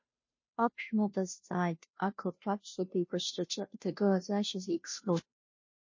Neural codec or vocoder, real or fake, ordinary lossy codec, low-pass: codec, 16 kHz, 0.5 kbps, FunCodec, trained on Chinese and English, 25 frames a second; fake; MP3, 32 kbps; 7.2 kHz